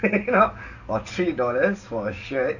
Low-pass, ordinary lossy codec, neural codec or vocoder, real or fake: 7.2 kHz; none; codec, 44.1 kHz, 7.8 kbps, DAC; fake